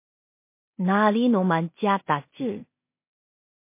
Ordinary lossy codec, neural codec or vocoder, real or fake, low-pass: MP3, 24 kbps; codec, 16 kHz in and 24 kHz out, 0.4 kbps, LongCat-Audio-Codec, two codebook decoder; fake; 3.6 kHz